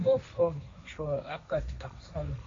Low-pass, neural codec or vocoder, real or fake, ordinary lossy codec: 7.2 kHz; codec, 16 kHz, 1.1 kbps, Voila-Tokenizer; fake; MP3, 48 kbps